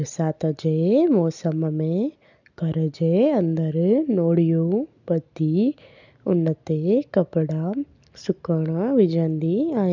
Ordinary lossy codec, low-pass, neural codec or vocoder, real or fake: none; 7.2 kHz; none; real